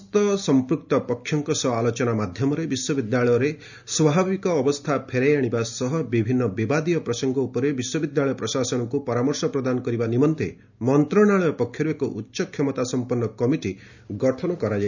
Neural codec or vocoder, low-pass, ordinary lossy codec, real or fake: none; 7.2 kHz; none; real